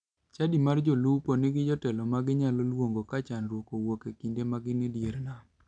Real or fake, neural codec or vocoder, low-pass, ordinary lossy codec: real; none; 9.9 kHz; none